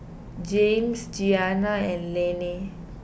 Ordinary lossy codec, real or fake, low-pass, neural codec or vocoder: none; real; none; none